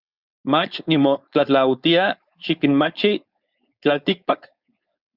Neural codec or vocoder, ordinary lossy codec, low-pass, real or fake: codec, 16 kHz, 4.8 kbps, FACodec; Opus, 64 kbps; 5.4 kHz; fake